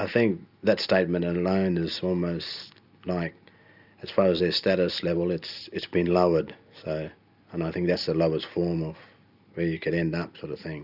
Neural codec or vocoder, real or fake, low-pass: none; real; 5.4 kHz